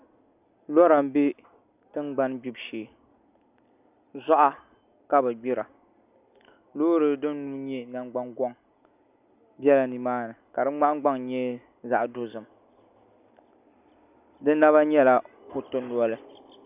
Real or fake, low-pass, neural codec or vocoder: real; 3.6 kHz; none